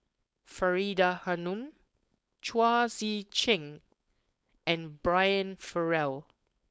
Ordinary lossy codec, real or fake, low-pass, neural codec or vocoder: none; fake; none; codec, 16 kHz, 4.8 kbps, FACodec